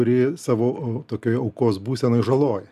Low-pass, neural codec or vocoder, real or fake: 14.4 kHz; none; real